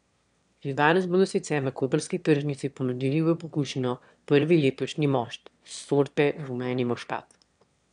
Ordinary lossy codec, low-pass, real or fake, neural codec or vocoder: none; 9.9 kHz; fake; autoencoder, 22.05 kHz, a latent of 192 numbers a frame, VITS, trained on one speaker